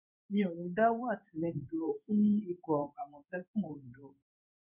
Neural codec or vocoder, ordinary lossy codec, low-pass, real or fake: codec, 16 kHz in and 24 kHz out, 1 kbps, XY-Tokenizer; none; 3.6 kHz; fake